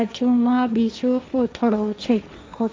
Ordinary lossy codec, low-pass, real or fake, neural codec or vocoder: none; none; fake; codec, 16 kHz, 1.1 kbps, Voila-Tokenizer